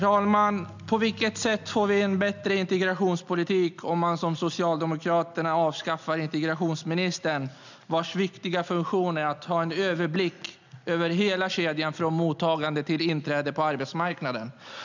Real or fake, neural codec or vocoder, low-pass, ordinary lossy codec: real; none; 7.2 kHz; none